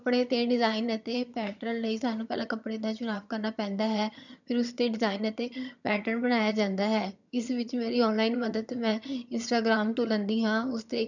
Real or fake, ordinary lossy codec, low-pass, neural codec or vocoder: fake; none; 7.2 kHz; vocoder, 22.05 kHz, 80 mel bands, HiFi-GAN